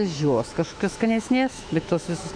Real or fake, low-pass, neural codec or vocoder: fake; 9.9 kHz; autoencoder, 48 kHz, 128 numbers a frame, DAC-VAE, trained on Japanese speech